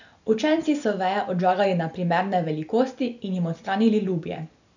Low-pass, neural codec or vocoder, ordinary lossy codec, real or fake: 7.2 kHz; none; none; real